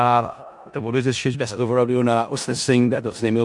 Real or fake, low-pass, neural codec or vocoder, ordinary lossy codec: fake; 10.8 kHz; codec, 16 kHz in and 24 kHz out, 0.4 kbps, LongCat-Audio-Codec, four codebook decoder; MP3, 64 kbps